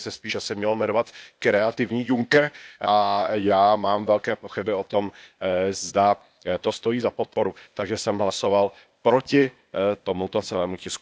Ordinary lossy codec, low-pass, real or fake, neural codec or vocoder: none; none; fake; codec, 16 kHz, 0.8 kbps, ZipCodec